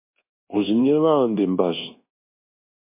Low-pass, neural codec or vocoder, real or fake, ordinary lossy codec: 3.6 kHz; codec, 24 kHz, 1.2 kbps, DualCodec; fake; MP3, 24 kbps